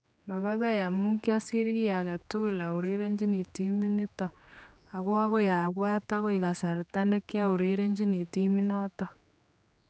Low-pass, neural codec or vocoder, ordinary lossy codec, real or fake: none; codec, 16 kHz, 2 kbps, X-Codec, HuBERT features, trained on general audio; none; fake